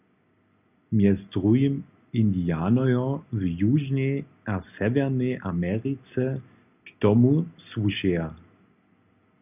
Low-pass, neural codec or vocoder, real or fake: 3.6 kHz; none; real